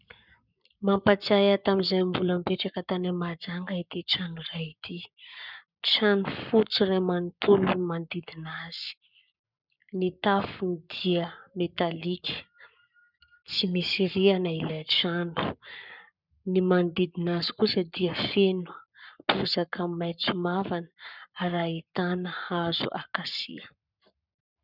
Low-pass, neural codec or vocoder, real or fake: 5.4 kHz; codec, 44.1 kHz, 7.8 kbps, Pupu-Codec; fake